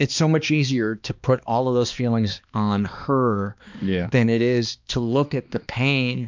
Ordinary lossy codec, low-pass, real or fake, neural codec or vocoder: MP3, 64 kbps; 7.2 kHz; fake; codec, 16 kHz, 2 kbps, X-Codec, HuBERT features, trained on balanced general audio